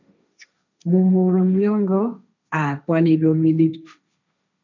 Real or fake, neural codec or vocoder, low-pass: fake; codec, 16 kHz, 1.1 kbps, Voila-Tokenizer; 7.2 kHz